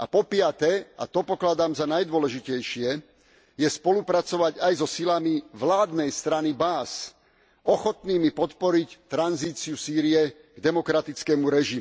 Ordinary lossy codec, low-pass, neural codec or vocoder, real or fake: none; none; none; real